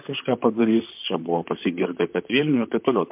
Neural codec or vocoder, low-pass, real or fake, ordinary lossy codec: vocoder, 44.1 kHz, 128 mel bands, Pupu-Vocoder; 3.6 kHz; fake; MP3, 32 kbps